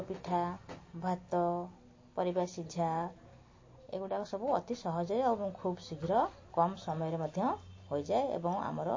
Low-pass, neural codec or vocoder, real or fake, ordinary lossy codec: 7.2 kHz; none; real; MP3, 32 kbps